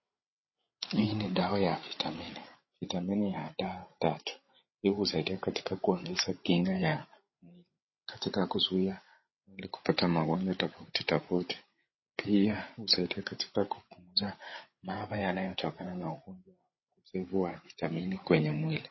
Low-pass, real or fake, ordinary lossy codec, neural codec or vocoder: 7.2 kHz; fake; MP3, 24 kbps; codec, 16 kHz, 8 kbps, FreqCodec, larger model